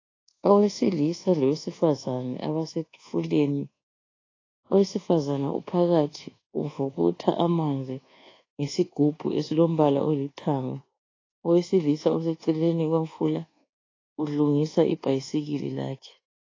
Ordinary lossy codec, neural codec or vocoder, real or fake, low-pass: AAC, 32 kbps; codec, 24 kHz, 1.2 kbps, DualCodec; fake; 7.2 kHz